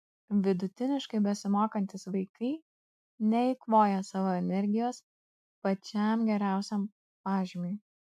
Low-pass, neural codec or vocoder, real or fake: 14.4 kHz; none; real